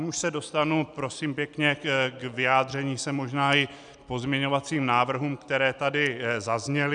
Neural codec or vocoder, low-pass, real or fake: none; 9.9 kHz; real